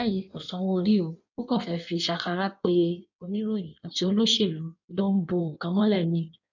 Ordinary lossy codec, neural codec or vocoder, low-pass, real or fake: none; codec, 16 kHz in and 24 kHz out, 1.1 kbps, FireRedTTS-2 codec; 7.2 kHz; fake